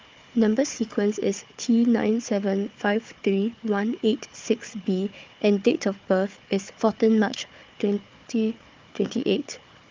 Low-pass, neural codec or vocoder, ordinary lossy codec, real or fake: 7.2 kHz; codec, 16 kHz, 4 kbps, FunCodec, trained on Chinese and English, 50 frames a second; Opus, 32 kbps; fake